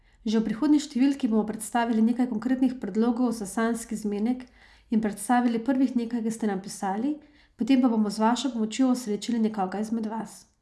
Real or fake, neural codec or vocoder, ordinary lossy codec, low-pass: real; none; none; none